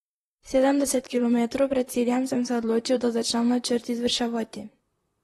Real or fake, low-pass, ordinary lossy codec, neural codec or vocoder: fake; 19.8 kHz; AAC, 32 kbps; vocoder, 44.1 kHz, 128 mel bands, Pupu-Vocoder